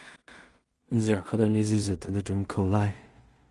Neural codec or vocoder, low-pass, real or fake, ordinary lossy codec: codec, 16 kHz in and 24 kHz out, 0.4 kbps, LongCat-Audio-Codec, two codebook decoder; 10.8 kHz; fake; Opus, 32 kbps